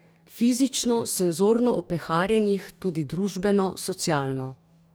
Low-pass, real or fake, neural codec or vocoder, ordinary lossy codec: none; fake; codec, 44.1 kHz, 2.6 kbps, DAC; none